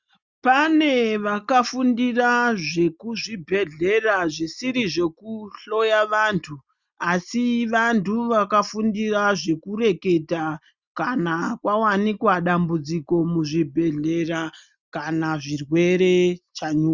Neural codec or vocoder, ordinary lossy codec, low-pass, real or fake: none; Opus, 64 kbps; 7.2 kHz; real